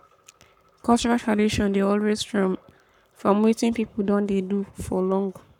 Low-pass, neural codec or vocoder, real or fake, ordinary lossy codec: 19.8 kHz; codec, 44.1 kHz, 7.8 kbps, Pupu-Codec; fake; none